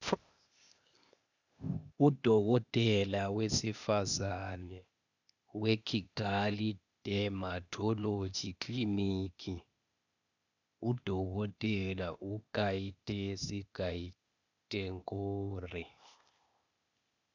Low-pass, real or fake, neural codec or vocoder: 7.2 kHz; fake; codec, 16 kHz, 0.8 kbps, ZipCodec